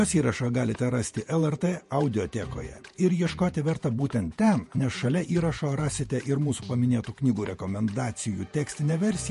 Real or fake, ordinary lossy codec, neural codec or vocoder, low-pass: fake; MP3, 48 kbps; vocoder, 48 kHz, 128 mel bands, Vocos; 14.4 kHz